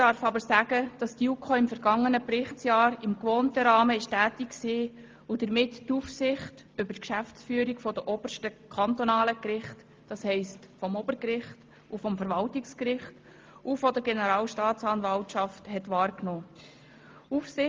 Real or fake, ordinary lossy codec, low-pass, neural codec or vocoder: real; Opus, 32 kbps; 7.2 kHz; none